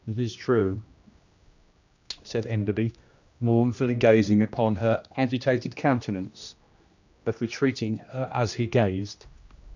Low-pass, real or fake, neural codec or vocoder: 7.2 kHz; fake; codec, 16 kHz, 1 kbps, X-Codec, HuBERT features, trained on general audio